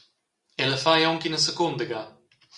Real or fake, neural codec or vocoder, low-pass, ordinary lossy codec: real; none; 10.8 kHz; Opus, 64 kbps